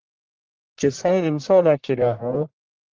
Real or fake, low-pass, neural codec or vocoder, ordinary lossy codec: fake; 7.2 kHz; codec, 44.1 kHz, 1.7 kbps, Pupu-Codec; Opus, 16 kbps